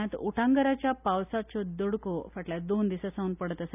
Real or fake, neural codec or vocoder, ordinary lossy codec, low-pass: real; none; none; 3.6 kHz